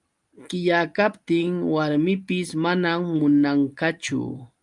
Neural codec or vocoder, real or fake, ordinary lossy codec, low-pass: none; real; Opus, 32 kbps; 10.8 kHz